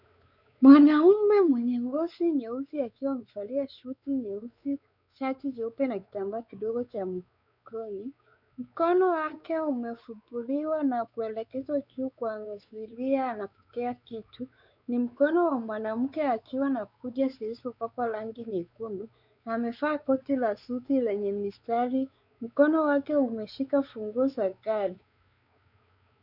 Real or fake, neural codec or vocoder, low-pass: fake; codec, 16 kHz, 4 kbps, X-Codec, WavLM features, trained on Multilingual LibriSpeech; 5.4 kHz